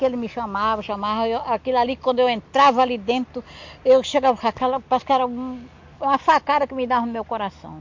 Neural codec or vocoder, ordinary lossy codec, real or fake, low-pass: none; MP3, 48 kbps; real; 7.2 kHz